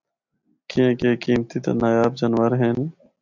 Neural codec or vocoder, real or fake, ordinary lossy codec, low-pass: none; real; MP3, 64 kbps; 7.2 kHz